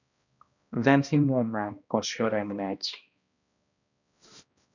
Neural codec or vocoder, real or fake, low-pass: codec, 16 kHz, 1 kbps, X-Codec, HuBERT features, trained on balanced general audio; fake; 7.2 kHz